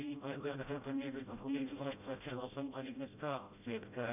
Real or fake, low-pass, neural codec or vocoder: fake; 3.6 kHz; codec, 16 kHz, 0.5 kbps, FreqCodec, smaller model